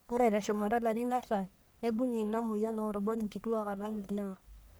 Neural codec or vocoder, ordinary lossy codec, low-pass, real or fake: codec, 44.1 kHz, 1.7 kbps, Pupu-Codec; none; none; fake